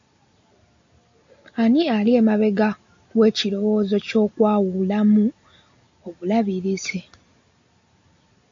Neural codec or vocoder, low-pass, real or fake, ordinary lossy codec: none; 7.2 kHz; real; AAC, 64 kbps